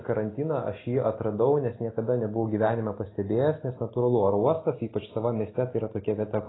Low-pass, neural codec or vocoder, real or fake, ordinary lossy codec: 7.2 kHz; none; real; AAC, 16 kbps